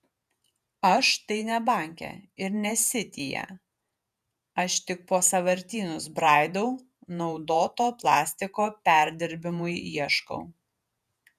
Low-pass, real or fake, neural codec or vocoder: 14.4 kHz; fake; vocoder, 48 kHz, 128 mel bands, Vocos